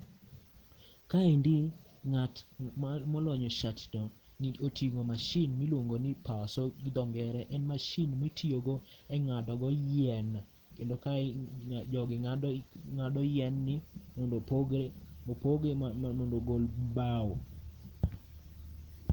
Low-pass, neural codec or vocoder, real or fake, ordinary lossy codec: 19.8 kHz; none; real; Opus, 16 kbps